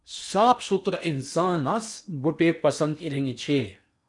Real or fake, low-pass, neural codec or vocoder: fake; 10.8 kHz; codec, 16 kHz in and 24 kHz out, 0.8 kbps, FocalCodec, streaming, 65536 codes